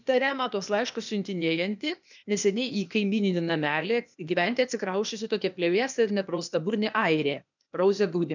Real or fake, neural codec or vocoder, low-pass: fake; codec, 16 kHz, 0.8 kbps, ZipCodec; 7.2 kHz